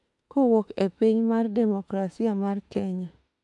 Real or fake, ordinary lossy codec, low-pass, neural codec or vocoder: fake; AAC, 64 kbps; 10.8 kHz; autoencoder, 48 kHz, 32 numbers a frame, DAC-VAE, trained on Japanese speech